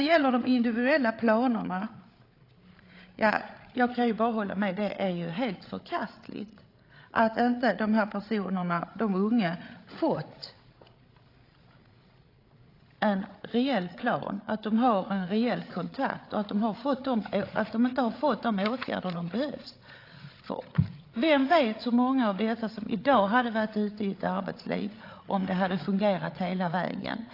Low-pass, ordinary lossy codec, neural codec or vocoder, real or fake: 5.4 kHz; AAC, 32 kbps; codec, 16 kHz, 8 kbps, FreqCodec, larger model; fake